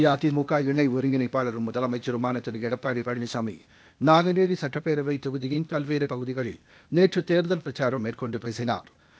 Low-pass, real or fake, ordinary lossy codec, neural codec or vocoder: none; fake; none; codec, 16 kHz, 0.8 kbps, ZipCodec